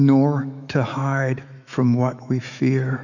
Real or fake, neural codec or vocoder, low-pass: fake; autoencoder, 48 kHz, 128 numbers a frame, DAC-VAE, trained on Japanese speech; 7.2 kHz